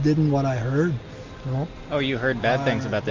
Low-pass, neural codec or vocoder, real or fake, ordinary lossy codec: 7.2 kHz; none; real; Opus, 64 kbps